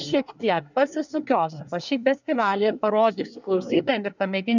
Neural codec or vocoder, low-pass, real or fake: codec, 24 kHz, 1 kbps, SNAC; 7.2 kHz; fake